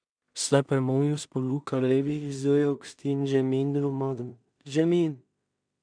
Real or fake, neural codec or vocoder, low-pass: fake; codec, 16 kHz in and 24 kHz out, 0.4 kbps, LongCat-Audio-Codec, two codebook decoder; 9.9 kHz